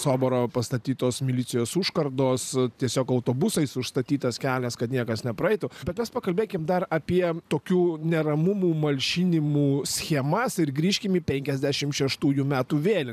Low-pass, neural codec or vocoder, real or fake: 14.4 kHz; vocoder, 44.1 kHz, 128 mel bands every 512 samples, BigVGAN v2; fake